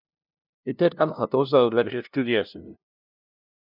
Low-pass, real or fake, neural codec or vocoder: 5.4 kHz; fake; codec, 16 kHz, 0.5 kbps, FunCodec, trained on LibriTTS, 25 frames a second